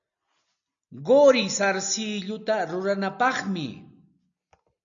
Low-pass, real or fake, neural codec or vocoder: 7.2 kHz; real; none